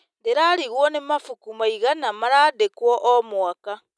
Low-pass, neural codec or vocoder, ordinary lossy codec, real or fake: none; none; none; real